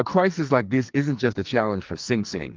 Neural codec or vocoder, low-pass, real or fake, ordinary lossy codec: codec, 44.1 kHz, 2.6 kbps, SNAC; 7.2 kHz; fake; Opus, 24 kbps